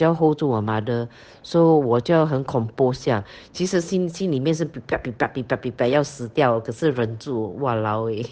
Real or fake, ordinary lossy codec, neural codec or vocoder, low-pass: fake; none; codec, 16 kHz, 8 kbps, FunCodec, trained on Chinese and English, 25 frames a second; none